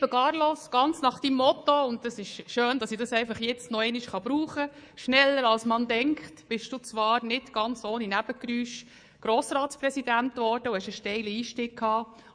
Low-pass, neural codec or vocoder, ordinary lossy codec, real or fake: 9.9 kHz; codec, 24 kHz, 3.1 kbps, DualCodec; none; fake